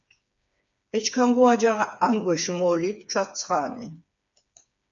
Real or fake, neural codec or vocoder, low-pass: fake; codec, 16 kHz, 4 kbps, FreqCodec, smaller model; 7.2 kHz